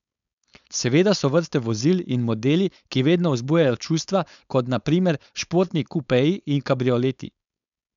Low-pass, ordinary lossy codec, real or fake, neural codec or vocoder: 7.2 kHz; none; fake; codec, 16 kHz, 4.8 kbps, FACodec